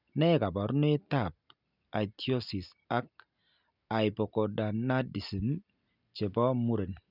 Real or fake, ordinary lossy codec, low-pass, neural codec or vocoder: real; none; 5.4 kHz; none